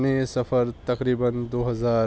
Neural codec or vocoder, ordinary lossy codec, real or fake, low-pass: none; none; real; none